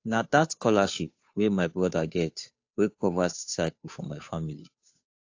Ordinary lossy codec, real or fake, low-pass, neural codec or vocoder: AAC, 48 kbps; fake; 7.2 kHz; codec, 16 kHz, 2 kbps, FunCodec, trained on Chinese and English, 25 frames a second